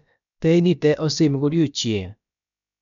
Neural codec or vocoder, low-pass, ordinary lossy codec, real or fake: codec, 16 kHz, about 1 kbps, DyCAST, with the encoder's durations; 7.2 kHz; none; fake